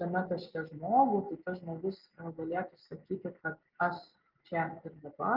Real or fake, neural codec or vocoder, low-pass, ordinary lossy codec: real; none; 5.4 kHz; Opus, 32 kbps